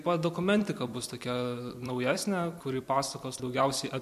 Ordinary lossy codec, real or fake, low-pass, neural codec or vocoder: MP3, 64 kbps; fake; 14.4 kHz; vocoder, 44.1 kHz, 128 mel bands every 256 samples, BigVGAN v2